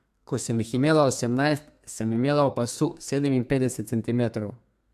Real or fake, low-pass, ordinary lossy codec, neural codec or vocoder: fake; 14.4 kHz; AAC, 96 kbps; codec, 32 kHz, 1.9 kbps, SNAC